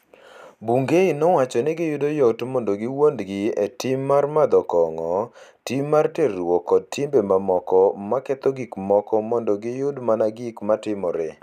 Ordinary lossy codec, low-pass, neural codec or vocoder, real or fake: none; 14.4 kHz; none; real